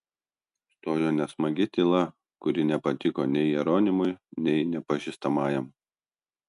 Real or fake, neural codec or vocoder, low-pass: real; none; 10.8 kHz